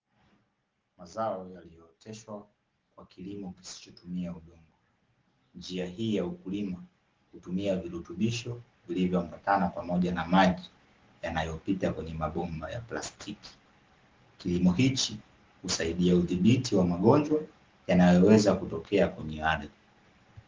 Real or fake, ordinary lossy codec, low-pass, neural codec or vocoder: real; Opus, 16 kbps; 7.2 kHz; none